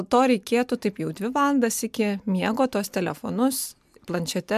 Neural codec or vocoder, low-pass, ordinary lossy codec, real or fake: none; 14.4 kHz; MP3, 96 kbps; real